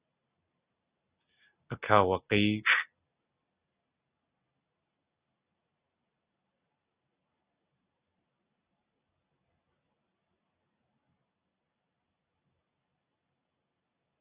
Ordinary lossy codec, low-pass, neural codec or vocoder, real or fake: Opus, 64 kbps; 3.6 kHz; none; real